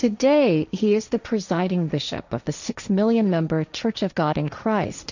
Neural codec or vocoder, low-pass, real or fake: codec, 16 kHz, 1.1 kbps, Voila-Tokenizer; 7.2 kHz; fake